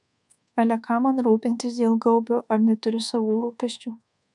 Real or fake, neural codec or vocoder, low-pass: fake; codec, 24 kHz, 1.2 kbps, DualCodec; 10.8 kHz